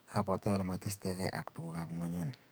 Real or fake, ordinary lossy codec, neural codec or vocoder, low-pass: fake; none; codec, 44.1 kHz, 2.6 kbps, SNAC; none